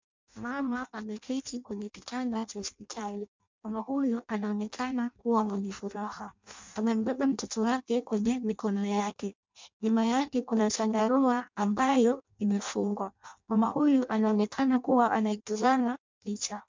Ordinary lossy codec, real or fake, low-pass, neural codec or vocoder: MP3, 64 kbps; fake; 7.2 kHz; codec, 16 kHz in and 24 kHz out, 0.6 kbps, FireRedTTS-2 codec